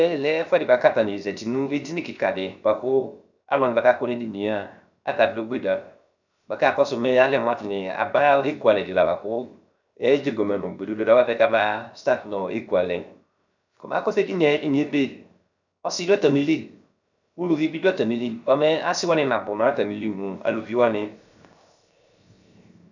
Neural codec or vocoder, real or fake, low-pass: codec, 16 kHz, 0.7 kbps, FocalCodec; fake; 7.2 kHz